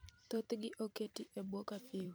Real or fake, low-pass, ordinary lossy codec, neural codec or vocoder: fake; none; none; vocoder, 44.1 kHz, 128 mel bands every 512 samples, BigVGAN v2